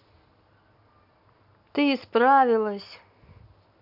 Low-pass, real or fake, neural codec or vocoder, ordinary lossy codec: 5.4 kHz; real; none; none